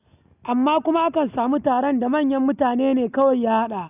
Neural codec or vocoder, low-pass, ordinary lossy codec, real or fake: none; 3.6 kHz; none; real